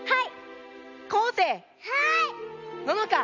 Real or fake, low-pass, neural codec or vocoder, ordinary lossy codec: real; 7.2 kHz; none; none